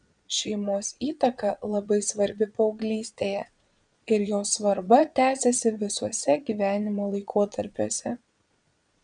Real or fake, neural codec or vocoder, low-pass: fake; vocoder, 22.05 kHz, 80 mel bands, Vocos; 9.9 kHz